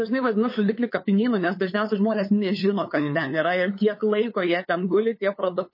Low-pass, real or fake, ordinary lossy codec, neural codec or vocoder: 5.4 kHz; fake; MP3, 24 kbps; codec, 16 kHz, 8 kbps, FunCodec, trained on LibriTTS, 25 frames a second